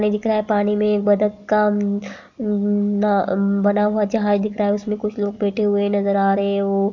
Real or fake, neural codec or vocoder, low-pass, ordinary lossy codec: real; none; 7.2 kHz; none